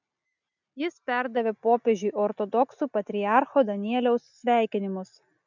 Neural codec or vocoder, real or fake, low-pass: none; real; 7.2 kHz